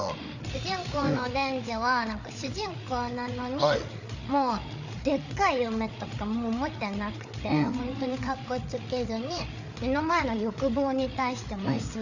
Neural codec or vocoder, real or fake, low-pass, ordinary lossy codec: codec, 16 kHz, 8 kbps, FreqCodec, larger model; fake; 7.2 kHz; none